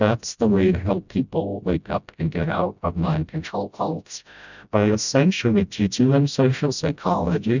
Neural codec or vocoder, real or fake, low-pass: codec, 16 kHz, 0.5 kbps, FreqCodec, smaller model; fake; 7.2 kHz